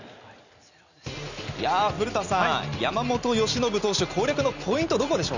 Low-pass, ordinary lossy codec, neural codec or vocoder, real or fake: 7.2 kHz; none; none; real